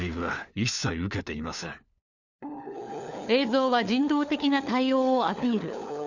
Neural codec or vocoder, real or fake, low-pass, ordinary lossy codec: codec, 16 kHz, 4 kbps, FunCodec, trained on LibriTTS, 50 frames a second; fake; 7.2 kHz; none